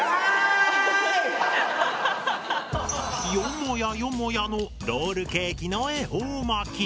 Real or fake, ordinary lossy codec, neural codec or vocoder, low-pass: real; none; none; none